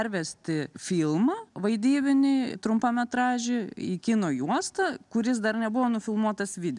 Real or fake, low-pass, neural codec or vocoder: real; 10.8 kHz; none